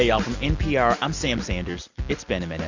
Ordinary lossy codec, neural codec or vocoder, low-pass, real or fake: Opus, 64 kbps; none; 7.2 kHz; real